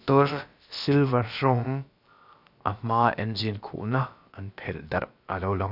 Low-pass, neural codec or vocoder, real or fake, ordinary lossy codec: 5.4 kHz; codec, 16 kHz, about 1 kbps, DyCAST, with the encoder's durations; fake; none